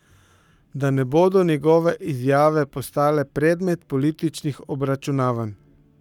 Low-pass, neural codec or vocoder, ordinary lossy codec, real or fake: 19.8 kHz; codec, 44.1 kHz, 7.8 kbps, Pupu-Codec; none; fake